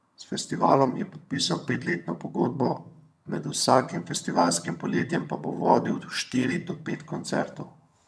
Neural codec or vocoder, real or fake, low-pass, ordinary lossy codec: vocoder, 22.05 kHz, 80 mel bands, HiFi-GAN; fake; none; none